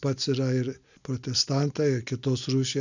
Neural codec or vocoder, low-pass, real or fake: none; 7.2 kHz; real